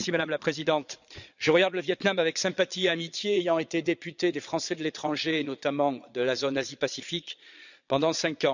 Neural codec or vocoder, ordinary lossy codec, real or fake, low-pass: vocoder, 22.05 kHz, 80 mel bands, Vocos; none; fake; 7.2 kHz